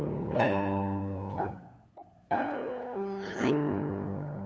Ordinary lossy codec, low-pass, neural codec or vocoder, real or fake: none; none; codec, 16 kHz, 8 kbps, FunCodec, trained on LibriTTS, 25 frames a second; fake